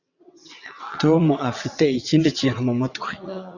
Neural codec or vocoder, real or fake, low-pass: vocoder, 22.05 kHz, 80 mel bands, WaveNeXt; fake; 7.2 kHz